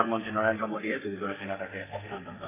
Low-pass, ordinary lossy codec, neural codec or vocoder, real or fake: 3.6 kHz; AAC, 16 kbps; codec, 32 kHz, 1.9 kbps, SNAC; fake